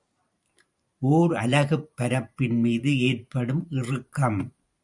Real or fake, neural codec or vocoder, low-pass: fake; vocoder, 44.1 kHz, 128 mel bands every 512 samples, BigVGAN v2; 10.8 kHz